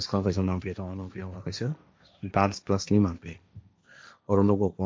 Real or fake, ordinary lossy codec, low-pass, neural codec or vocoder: fake; none; none; codec, 16 kHz, 1.1 kbps, Voila-Tokenizer